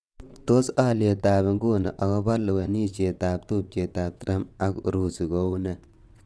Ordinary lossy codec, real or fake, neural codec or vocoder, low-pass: none; fake; vocoder, 22.05 kHz, 80 mel bands, WaveNeXt; none